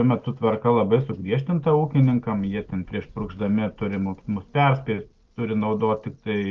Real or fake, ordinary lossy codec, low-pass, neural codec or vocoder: real; Opus, 24 kbps; 7.2 kHz; none